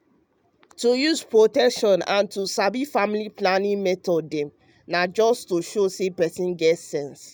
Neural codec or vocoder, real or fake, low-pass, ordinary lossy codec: none; real; none; none